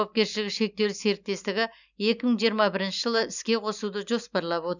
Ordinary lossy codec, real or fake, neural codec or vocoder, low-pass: none; real; none; 7.2 kHz